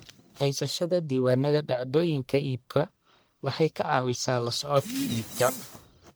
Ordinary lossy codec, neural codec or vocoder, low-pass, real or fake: none; codec, 44.1 kHz, 1.7 kbps, Pupu-Codec; none; fake